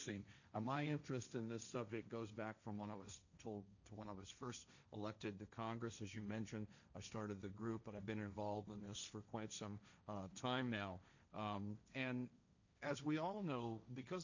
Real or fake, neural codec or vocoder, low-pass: fake; codec, 16 kHz, 1.1 kbps, Voila-Tokenizer; 7.2 kHz